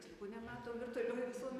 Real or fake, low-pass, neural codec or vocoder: real; 14.4 kHz; none